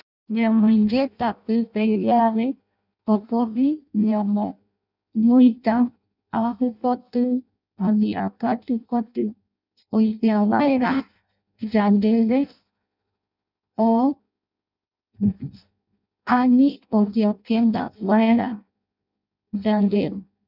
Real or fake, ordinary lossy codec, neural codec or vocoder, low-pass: fake; none; codec, 16 kHz in and 24 kHz out, 0.6 kbps, FireRedTTS-2 codec; 5.4 kHz